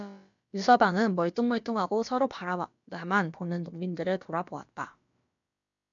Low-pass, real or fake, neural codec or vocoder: 7.2 kHz; fake; codec, 16 kHz, about 1 kbps, DyCAST, with the encoder's durations